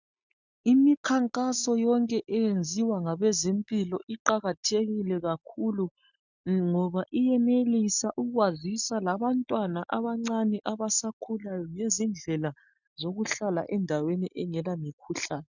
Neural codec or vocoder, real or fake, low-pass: none; real; 7.2 kHz